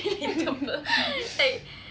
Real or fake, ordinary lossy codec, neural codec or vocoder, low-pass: real; none; none; none